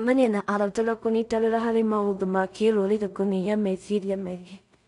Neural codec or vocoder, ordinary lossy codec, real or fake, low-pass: codec, 16 kHz in and 24 kHz out, 0.4 kbps, LongCat-Audio-Codec, two codebook decoder; none; fake; 10.8 kHz